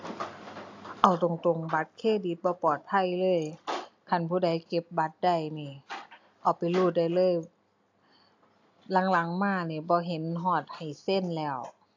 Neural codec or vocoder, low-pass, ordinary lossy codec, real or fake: none; 7.2 kHz; AAC, 48 kbps; real